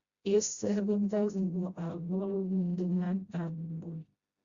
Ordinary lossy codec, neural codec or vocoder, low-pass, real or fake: Opus, 64 kbps; codec, 16 kHz, 0.5 kbps, FreqCodec, smaller model; 7.2 kHz; fake